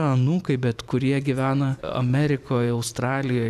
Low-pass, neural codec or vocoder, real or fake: 14.4 kHz; vocoder, 44.1 kHz, 128 mel bands every 512 samples, BigVGAN v2; fake